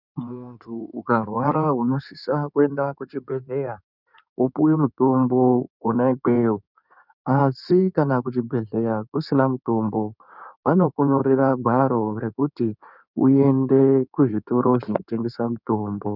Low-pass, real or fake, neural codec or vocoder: 5.4 kHz; fake; codec, 16 kHz in and 24 kHz out, 2.2 kbps, FireRedTTS-2 codec